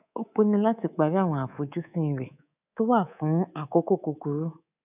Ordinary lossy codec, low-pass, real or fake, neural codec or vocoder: none; 3.6 kHz; fake; codec, 24 kHz, 3.1 kbps, DualCodec